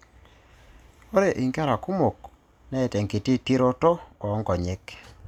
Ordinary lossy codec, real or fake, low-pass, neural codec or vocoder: none; real; 19.8 kHz; none